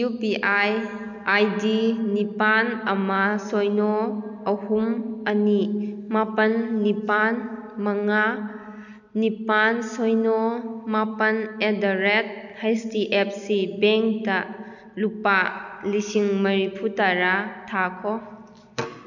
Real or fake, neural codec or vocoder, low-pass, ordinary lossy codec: real; none; 7.2 kHz; none